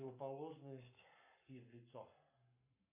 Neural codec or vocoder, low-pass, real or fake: codec, 44.1 kHz, 7.8 kbps, Pupu-Codec; 3.6 kHz; fake